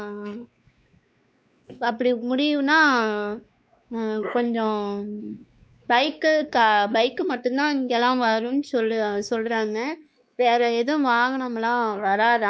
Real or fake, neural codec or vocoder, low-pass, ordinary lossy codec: fake; codec, 16 kHz, 2 kbps, X-Codec, WavLM features, trained on Multilingual LibriSpeech; none; none